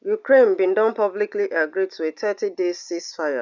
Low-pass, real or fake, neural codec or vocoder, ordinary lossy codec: 7.2 kHz; real; none; none